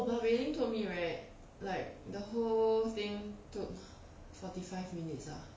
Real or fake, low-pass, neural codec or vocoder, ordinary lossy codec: real; none; none; none